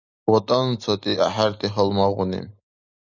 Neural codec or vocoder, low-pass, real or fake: none; 7.2 kHz; real